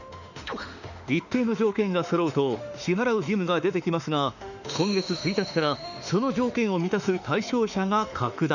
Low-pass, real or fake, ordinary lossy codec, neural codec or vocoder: 7.2 kHz; fake; none; autoencoder, 48 kHz, 32 numbers a frame, DAC-VAE, trained on Japanese speech